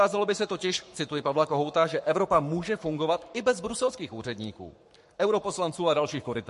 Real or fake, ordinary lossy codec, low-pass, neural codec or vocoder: fake; MP3, 48 kbps; 14.4 kHz; codec, 44.1 kHz, 7.8 kbps, Pupu-Codec